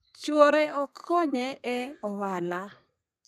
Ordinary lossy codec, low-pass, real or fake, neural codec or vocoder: none; 14.4 kHz; fake; codec, 32 kHz, 1.9 kbps, SNAC